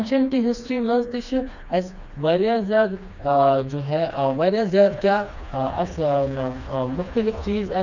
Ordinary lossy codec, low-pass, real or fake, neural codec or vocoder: none; 7.2 kHz; fake; codec, 16 kHz, 2 kbps, FreqCodec, smaller model